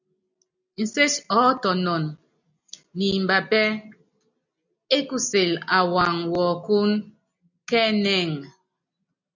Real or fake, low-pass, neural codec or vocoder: real; 7.2 kHz; none